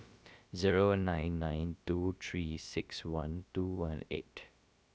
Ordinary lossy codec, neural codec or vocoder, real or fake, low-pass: none; codec, 16 kHz, about 1 kbps, DyCAST, with the encoder's durations; fake; none